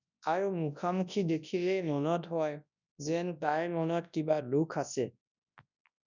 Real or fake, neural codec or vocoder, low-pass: fake; codec, 24 kHz, 0.9 kbps, WavTokenizer, large speech release; 7.2 kHz